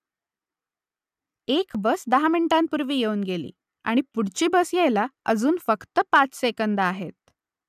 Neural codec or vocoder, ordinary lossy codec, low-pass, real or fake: none; none; 14.4 kHz; real